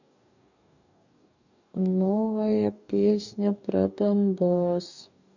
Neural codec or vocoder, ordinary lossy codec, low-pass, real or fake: codec, 44.1 kHz, 2.6 kbps, DAC; none; 7.2 kHz; fake